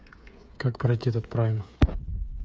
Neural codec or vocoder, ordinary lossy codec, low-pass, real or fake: codec, 16 kHz, 8 kbps, FreqCodec, smaller model; none; none; fake